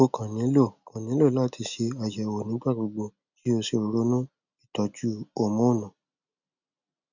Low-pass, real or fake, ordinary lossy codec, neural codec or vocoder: 7.2 kHz; real; none; none